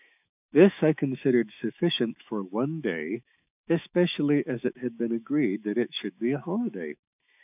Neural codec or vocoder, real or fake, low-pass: autoencoder, 48 kHz, 128 numbers a frame, DAC-VAE, trained on Japanese speech; fake; 3.6 kHz